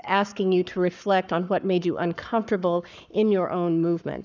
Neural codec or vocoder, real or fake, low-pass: codec, 44.1 kHz, 7.8 kbps, Pupu-Codec; fake; 7.2 kHz